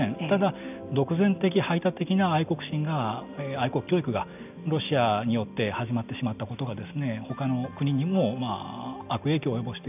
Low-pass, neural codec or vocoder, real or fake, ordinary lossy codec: 3.6 kHz; none; real; none